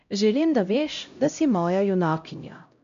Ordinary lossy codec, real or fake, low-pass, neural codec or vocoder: AAC, 64 kbps; fake; 7.2 kHz; codec, 16 kHz, 0.5 kbps, X-Codec, HuBERT features, trained on LibriSpeech